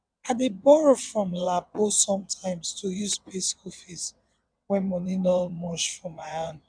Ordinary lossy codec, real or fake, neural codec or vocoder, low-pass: none; fake; vocoder, 22.05 kHz, 80 mel bands, WaveNeXt; 9.9 kHz